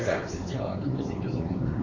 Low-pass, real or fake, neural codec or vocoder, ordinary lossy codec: 7.2 kHz; fake; codec, 16 kHz, 4 kbps, X-Codec, WavLM features, trained on Multilingual LibriSpeech; AAC, 32 kbps